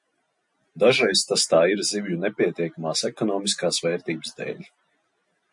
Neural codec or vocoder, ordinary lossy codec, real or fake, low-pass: none; MP3, 48 kbps; real; 10.8 kHz